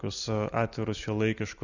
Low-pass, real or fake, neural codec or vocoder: 7.2 kHz; real; none